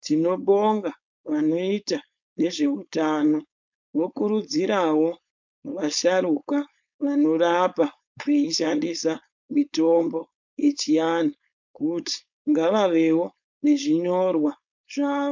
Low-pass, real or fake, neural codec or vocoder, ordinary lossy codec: 7.2 kHz; fake; codec, 16 kHz, 4.8 kbps, FACodec; MP3, 64 kbps